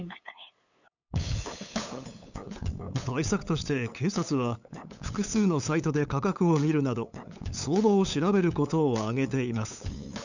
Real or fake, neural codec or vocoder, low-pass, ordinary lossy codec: fake; codec, 16 kHz, 8 kbps, FunCodec, trained on LibriTTS, 25 frames a second; 7.2 kHz; none